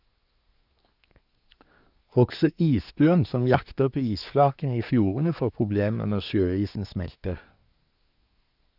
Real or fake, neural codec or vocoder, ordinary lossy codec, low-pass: fake; codec, 24 kHz, 1 kbps, SNAC; none; 5.4 kHz